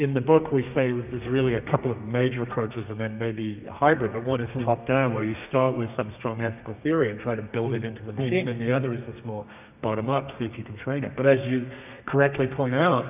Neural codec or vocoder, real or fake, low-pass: codec, 32 kHz, 1.9 kbps, SNAC; fake; 3.6 kHz